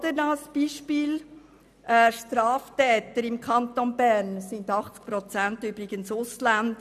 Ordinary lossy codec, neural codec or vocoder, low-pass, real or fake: none; none; 14.4 kHz; real